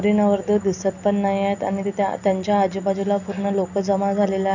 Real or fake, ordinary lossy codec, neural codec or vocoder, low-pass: real; none; none; 7.2 kHz